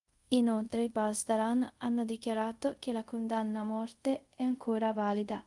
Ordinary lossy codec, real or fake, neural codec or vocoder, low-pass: Opus, 32 kbps; fake; codec, 24 kHz, 0.5 kbps, DualCodec; 10.8 kHz